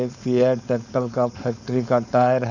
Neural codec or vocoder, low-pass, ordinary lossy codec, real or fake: codec, 16 kHz, 4.8 kbps, FACodec; 7.2 kHz; none; fake